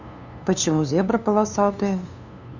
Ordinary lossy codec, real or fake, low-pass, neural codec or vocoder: none; fake; 7.2 kHz; codec, 16 kHz, 2 kbps, FunCodec, trained on LibriTTS, 25 frames a second